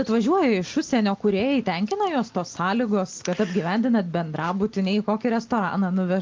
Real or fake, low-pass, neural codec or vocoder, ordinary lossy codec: real; 7.2 kHz; none; Opus, 24 kbps